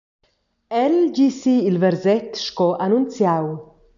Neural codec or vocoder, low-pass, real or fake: none; 7.2 kHz; real